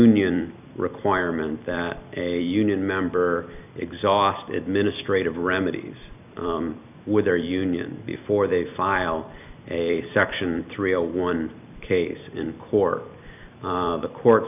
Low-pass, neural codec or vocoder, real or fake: 3.6 kHz; vocoder, 44.1 kHz, 128 mel bands every 256 samples, BigVGAN v2; fake